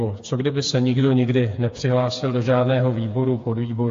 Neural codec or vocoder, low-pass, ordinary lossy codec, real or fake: codec, 16 kHz, 4 kbps, FreqCodec, smaller model; 7.2 kHz; AAC, 48 kbps; fake